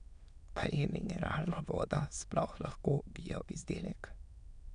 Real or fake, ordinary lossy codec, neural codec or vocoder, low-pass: fake; none; autoencoder, 22.05 kHz, a latent of 192 numbers a frame, VITS, trained on many speakers; 9.9 kHz